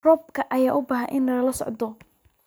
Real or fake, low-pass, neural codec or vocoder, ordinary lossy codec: real; none; none; none